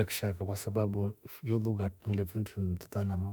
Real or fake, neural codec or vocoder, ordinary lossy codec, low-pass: fake; autoencoder, 48 kHz, 32 numbers a frame, DAC-VAE, trained on Japanese speech; none; none